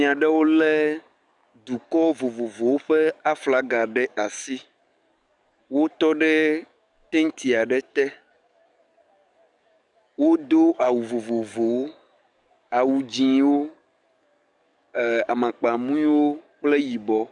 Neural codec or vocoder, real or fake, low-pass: codec, 44.1 kHz, 7.8 kbps, DAC; fake; 10.8 kHz